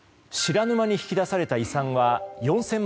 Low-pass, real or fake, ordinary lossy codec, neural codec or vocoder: none; real; none; none